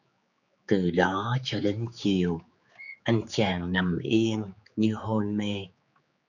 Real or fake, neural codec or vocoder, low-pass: fake; codec, 16 kHz, 4 kbps, X-Codec, HuBERT features, trained on general audio; 7.2 kHz